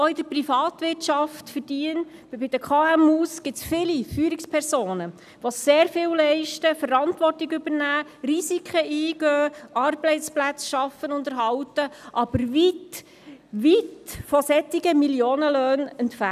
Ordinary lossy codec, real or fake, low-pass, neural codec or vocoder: none; real; 14.4 kHz; none